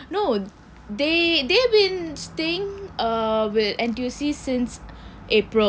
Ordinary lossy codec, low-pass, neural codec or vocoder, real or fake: none; none; none; real